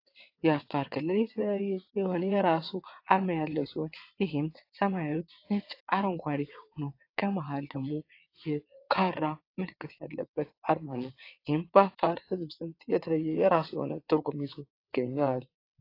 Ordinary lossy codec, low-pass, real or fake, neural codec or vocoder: AAC, 32 kbps; 5.4 kHz; fake; vocoder, 22.05 kHz, 80 mel bands, WaveNeXt